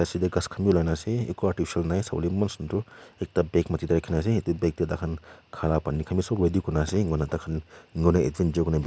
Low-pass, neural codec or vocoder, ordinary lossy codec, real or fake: none; none; none; real